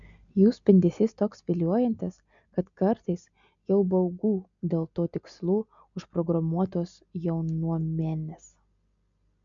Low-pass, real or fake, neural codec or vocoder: 7.2 kHz; real; none